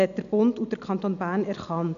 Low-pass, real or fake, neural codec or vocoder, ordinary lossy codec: 7.2 kHz; real; none; none